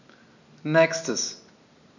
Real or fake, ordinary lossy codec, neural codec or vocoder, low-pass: real; none; none; 7.2 kHz